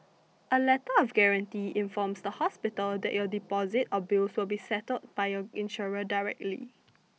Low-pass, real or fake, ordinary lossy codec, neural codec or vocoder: none; real; none; none